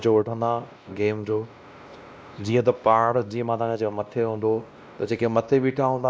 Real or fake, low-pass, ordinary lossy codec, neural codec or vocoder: fake; none; none; codec, 16 kHz, 1 kbps, X-Codec, WavLM features, trained on Multilingual LibriSpeech